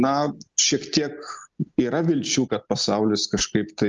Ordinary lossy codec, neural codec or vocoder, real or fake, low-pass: Opus, 32 kbps; none; real; 7.2 kHz